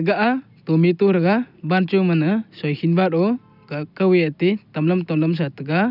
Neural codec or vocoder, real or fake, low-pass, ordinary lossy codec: none; real; 5.4 kHz; none